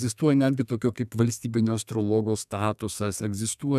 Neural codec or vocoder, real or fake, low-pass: codec, 32 kHz, 1.9 kbps, SNAC; fake; 14.4 kHz